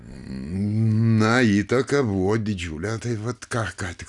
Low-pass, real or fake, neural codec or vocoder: 10.8 kHz; real; none